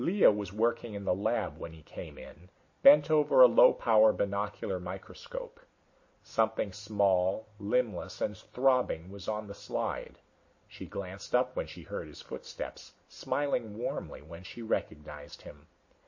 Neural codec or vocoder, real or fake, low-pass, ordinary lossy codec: none; real; 7.2 kHz; MP3, 32 kbps